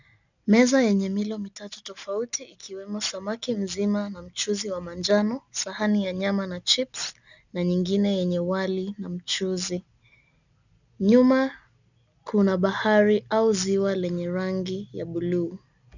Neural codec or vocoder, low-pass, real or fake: none; 7.2 kHz; real